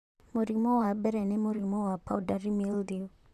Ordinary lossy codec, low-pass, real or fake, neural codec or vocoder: none; 14.4 kHz; fake; vocoder, 44.1 kHz, 128 mel bands, Pupu-Vocoder